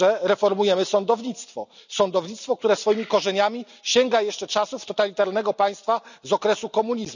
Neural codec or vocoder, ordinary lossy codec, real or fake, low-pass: none; none; real; 7.2 kHz